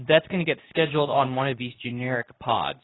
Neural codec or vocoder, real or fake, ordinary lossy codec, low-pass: codec, 24 kHz, 0.9 kbps, WavTokenizer, medium speech release version 2; fake; AAC, 16 kbps; 7.2 kHz